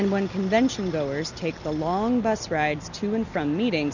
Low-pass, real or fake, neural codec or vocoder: 7.2 kHz; real; none